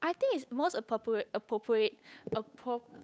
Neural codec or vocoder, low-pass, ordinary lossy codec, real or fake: codec, 16 kHz, 8 kbps, FunCodec, trained on Chinese and English, 25 frames a second; none; none; fake